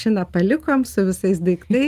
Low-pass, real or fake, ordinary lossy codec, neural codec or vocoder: 14.4 kHz; real; Opus, 24 kbps; none